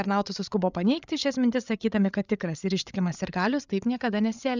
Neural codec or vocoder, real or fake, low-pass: codec, 16 kHz, 8 kbps, FreqCodec, larger model; fake; 7.2 kHz